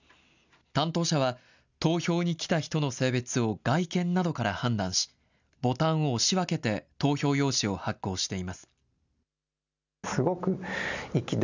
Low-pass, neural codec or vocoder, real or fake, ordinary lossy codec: 7.2 kHz; none; real; none